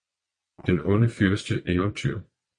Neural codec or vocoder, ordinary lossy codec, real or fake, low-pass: vocoder, 22.05 kHz, 80 mel bands, WaveNeXt; MP3, 48 kbps; fake; 9.9 kHz